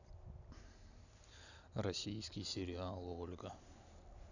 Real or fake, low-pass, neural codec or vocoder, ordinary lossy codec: real; 7.2 kHz; none; none